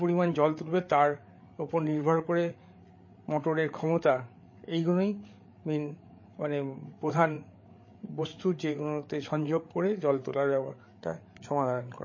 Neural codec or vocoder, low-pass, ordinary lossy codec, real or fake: codec, 16 kHz, 8 kbps, FreqCodec, larger model; 7.2 kHz; MP3, 32 kbps; fake